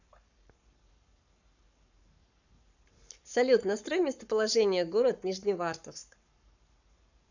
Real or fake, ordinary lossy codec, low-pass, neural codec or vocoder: fake; none; 7.2 kHz; codec, 44.1 kHz, 7.8 kbps, Pupu-Codec